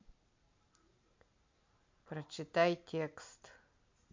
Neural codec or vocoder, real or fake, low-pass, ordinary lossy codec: none; real; 7.2 kHz; MP3, 48 kbps